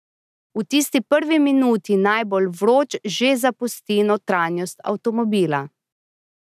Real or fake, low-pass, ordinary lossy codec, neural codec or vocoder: real; 14.4 kHz; none; none